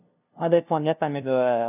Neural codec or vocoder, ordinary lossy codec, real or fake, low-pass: codec, 16 kHz, 0.5 kbps, FunCodec, trained on LibriTTS, 25 frames a second; none; fake; 3.6 kHz